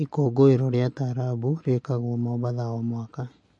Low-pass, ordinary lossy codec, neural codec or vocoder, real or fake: 10.8 kHz; MP3, 48 kbps; none; real